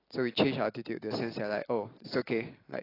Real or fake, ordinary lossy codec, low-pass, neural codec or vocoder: real; AAC, 24 kbps; 5.4 kHz; none